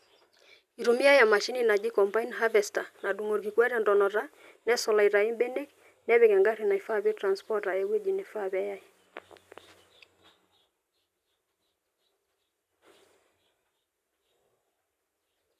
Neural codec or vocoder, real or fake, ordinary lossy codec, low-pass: none; real; none; 14.4 kHz